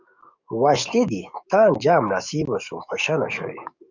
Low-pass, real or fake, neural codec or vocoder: 7.2 kHz; fake; codec, 44.1 kHz, 7.8 kbps, DAC